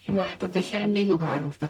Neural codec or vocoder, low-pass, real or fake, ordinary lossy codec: codec, 44.1 kHz, 0.9 kbps, DAC; 19.8 kHz; fake; none